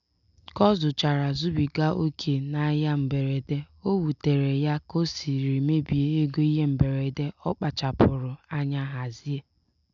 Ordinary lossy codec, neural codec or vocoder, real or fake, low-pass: none; none; real; 7.2 kHz